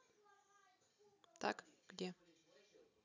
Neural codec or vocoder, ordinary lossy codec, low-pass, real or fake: none; none; 7.2 kHz; real